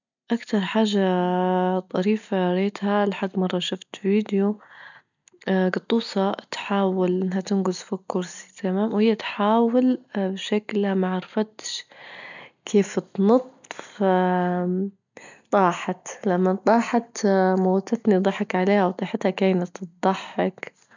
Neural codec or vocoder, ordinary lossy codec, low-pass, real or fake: none; none; 7.2 kHz; real